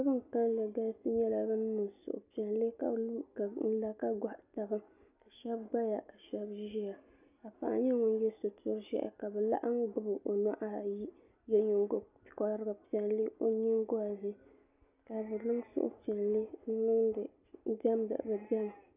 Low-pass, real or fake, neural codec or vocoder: 3.6 kHz; real; none